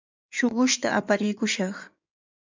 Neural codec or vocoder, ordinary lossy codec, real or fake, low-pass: codec, 16 kHz in and 24 kHz out, 2.2 kbps, FireRedTTS-2 codec; MP3, 64 kbps; fake; 7.2 kHz